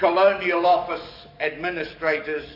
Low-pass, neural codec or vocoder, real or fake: 5.4 kHz; codec, 44.1 kHz, 7.8 kbps, DAC; fake